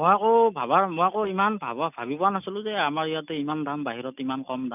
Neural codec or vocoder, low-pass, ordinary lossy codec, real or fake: none; 3.6 kHz; none; real